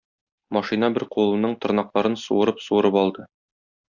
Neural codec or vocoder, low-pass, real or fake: none; 7.2 kHz; real